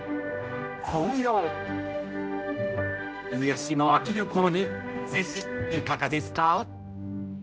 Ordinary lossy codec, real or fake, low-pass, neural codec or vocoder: none; fake; none; codec, 16 kHz, 0.5 kbps, X-Codec, HuBERT features, trained on general audio